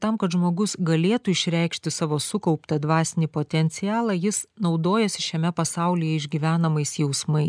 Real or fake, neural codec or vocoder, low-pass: real; none; 9.9 kHz